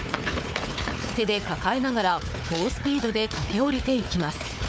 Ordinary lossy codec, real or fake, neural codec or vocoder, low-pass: none; fake; codec, 16 kHz, 4 kbps, FunCodec, trained on Chinese and English, 50 frames a second; none